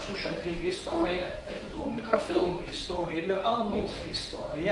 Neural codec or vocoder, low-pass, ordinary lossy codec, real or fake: codec, 24 kHz, 0.9 kbps, WavTokenizer, medium speech release version 1; 10.8 kHz; AAC, 64 kbps; fake